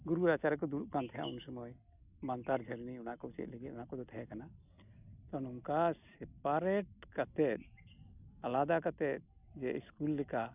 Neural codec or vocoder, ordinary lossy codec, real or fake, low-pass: none; none; real; 3.6 kHz